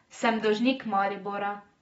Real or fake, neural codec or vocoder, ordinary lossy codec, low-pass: real; none; AAC, 24 kbps; 10.8 kHz